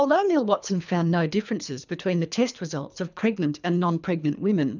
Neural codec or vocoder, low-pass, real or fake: codec, 24 kHz, 3 kbps, HILCodec; 7.2 kHz; fake